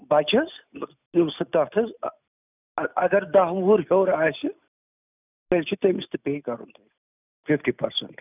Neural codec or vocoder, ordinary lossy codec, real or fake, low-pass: none; none; real; 3.6 kHz